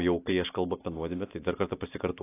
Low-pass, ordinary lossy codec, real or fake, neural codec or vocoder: 3.6 kHz; AAC, 24 kbps; real; none